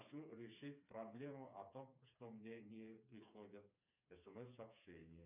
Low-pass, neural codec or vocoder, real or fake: 3.6 kHz; codec, 16 kHz, 4 kbps, FreqCodec, smaller model; fake